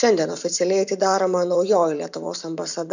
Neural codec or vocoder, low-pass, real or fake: vocoder, 44.1 kHz, 128 mel bands every 512 samples, BigVGAN v2; 7.2 kHz; fake